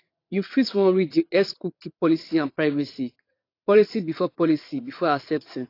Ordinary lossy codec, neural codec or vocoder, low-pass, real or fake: AAC, 32 kbps; vocoder, 22.05 kHz, 80 mel bands, WaveNeXt; 5.4 kHz; fake